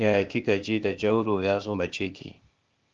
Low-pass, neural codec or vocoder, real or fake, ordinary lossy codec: 7.2 kHz; codec, 16 kHz, 0.8 kbps, ZipCodec; fake; Opus, 32 kbps